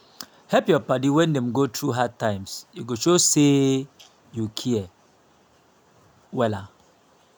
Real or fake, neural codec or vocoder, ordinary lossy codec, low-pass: real; none; none; none